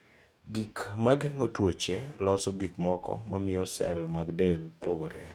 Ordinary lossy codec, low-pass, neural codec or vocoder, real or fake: none; 19.8 kHz; codec, 44.1 kHz, 2.6 kbps, DAC; fake